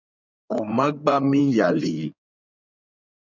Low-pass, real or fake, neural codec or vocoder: 7.2 kHz; fake; codec, 16 kHz in and 24 kHz out, 2.2 kbps, FireRedTTS-2 codec